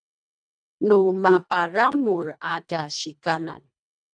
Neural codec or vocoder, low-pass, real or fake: codec, 24 kHz, 1.5 kbps, HILCodec; 9.9 kHz; fake